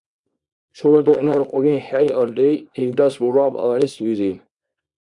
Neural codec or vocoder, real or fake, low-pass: codec, 24 kHz, 0.9 kbps, WavTokenizer, small release; fake; 10.8 kHz